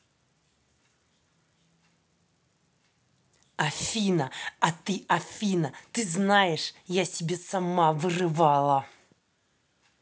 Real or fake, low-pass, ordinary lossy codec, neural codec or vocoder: real; none; none; none